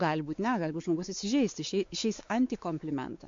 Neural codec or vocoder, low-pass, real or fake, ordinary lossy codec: codec, 16 kHz, 4 kbps, X-Codec, WavLM features, trained on Multilingual LibriSpeech; 7.2 kHz; fake; MP3, 48 kbps